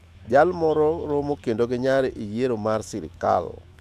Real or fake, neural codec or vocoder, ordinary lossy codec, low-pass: fake; autoencoder, 48 kHz, 128 numbers a frame, DAC-VAE, trained on Japanese speech; AAC, 96 kbps; 14.4 kHz